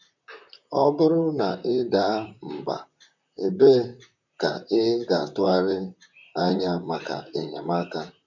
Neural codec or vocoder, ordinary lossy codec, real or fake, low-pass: vocoder, 44.1 kHz, 128 mel bands, Pupu-Vocoder; none; fake; 7.2 kHz